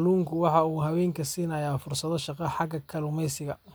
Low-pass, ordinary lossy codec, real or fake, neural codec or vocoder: none; none; real; none